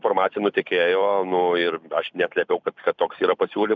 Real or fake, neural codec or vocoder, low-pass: fake; vocoder, 44.1 kHz, 128 mel bands every 256 samples, BigVGAN v2; 7.2 kHz